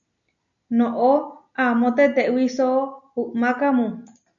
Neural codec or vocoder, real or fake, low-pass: none; real; 7.2 kHz